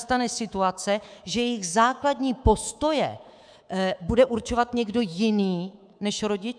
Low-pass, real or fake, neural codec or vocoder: 9.9 kHz; fake; codec, 24 kHz, 3.1 kbps, DualCodec